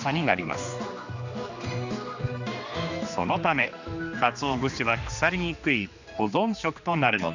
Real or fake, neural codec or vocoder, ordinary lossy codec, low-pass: fake; codec, 16 kHz, 2 kbps, X-Codec, HuBERT features, trained on general audio; none; 7.2 kHz